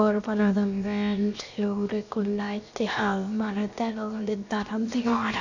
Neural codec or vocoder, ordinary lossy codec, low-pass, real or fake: codec, 16 kHz, 0.7 kbps, FocalCodec; none; 7.2 kHz; fake